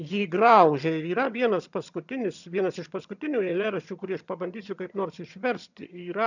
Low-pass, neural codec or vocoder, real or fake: 7.2 kHz; vocoder, 22.05 kHz, 80 mel bands, HiFi-GAN; fake